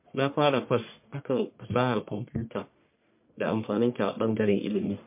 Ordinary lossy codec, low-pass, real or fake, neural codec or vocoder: MP3, 32 kbps; 3.6 kHz; fake; codec, 44.1 kHz, 1.7 kbps, Pupu-Codec